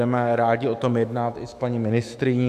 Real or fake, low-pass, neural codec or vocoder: fake; 14.4 kHz; autoencoder, 48 kHz, 128 numbers a frame, DAC-VAE, trained on Japanese speech